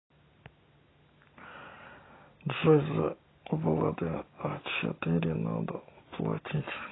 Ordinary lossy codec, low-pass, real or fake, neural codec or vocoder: AAC, 16 kbps; 7.2 kHz; real; none